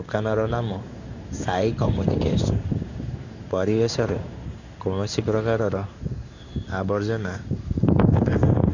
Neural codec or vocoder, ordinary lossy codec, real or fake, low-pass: codec, 16 kHz in and 24 kHz out, 1 kbps, XY-Tokenizer; none; fake; 7.2 kHz